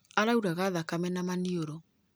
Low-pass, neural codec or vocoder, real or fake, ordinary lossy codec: none; none; real; none